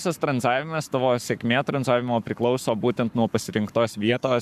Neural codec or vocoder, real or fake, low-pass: codec, 44.1 kHz, 7.8 kbps, Pupu-Codec; fake; 14.4 kHz